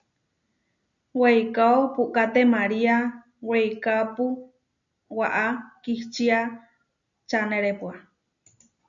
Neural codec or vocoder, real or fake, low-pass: none; real; 7.2 kHz